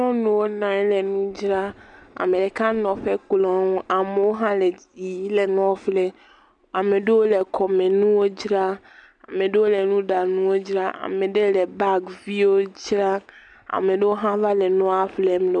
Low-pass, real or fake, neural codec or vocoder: 9.9 kHz; real; none